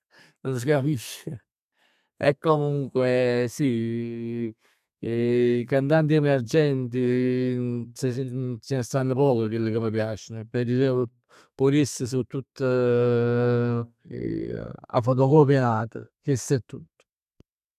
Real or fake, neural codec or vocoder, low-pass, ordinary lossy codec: fake; codec, 32 kHz, 1.9 kbps, SNAC; 14.4 kHz; none